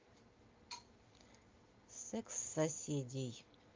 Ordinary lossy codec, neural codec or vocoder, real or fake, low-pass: Opus, 24 kbps; none; real; 7.2 kHz